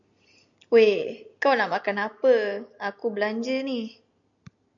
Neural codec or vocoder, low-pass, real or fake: none; 7.2 kHz; real